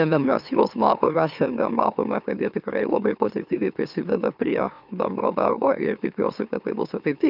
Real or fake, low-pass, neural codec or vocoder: fake; 5.4 kHz; autoencoder, 44.1 kHz, a latent of 192 numbers a frame, MeloTTS